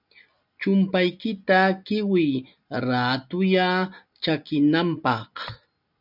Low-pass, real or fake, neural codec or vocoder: 5.4 kHz; real; none